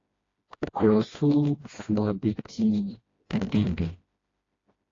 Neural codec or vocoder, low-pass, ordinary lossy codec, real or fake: codec, 16 kHz, 1 kbps, FreqCodec, smaller model; 7.2 kHz; AAC, 32 kbps; fake